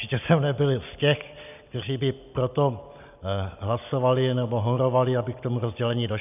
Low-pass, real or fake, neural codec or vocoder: 3.6 kHz; real; none